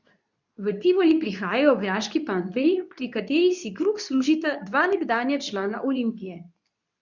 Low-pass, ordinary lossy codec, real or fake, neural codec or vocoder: 7.2 kHz; none; fake; codec, 24 kHz, 0.9 kbps, WavTokenizer, medium speech release version 2